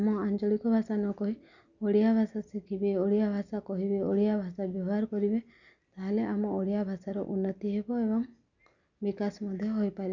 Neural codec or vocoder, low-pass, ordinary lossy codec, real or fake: none; 7.2 kHz; none; real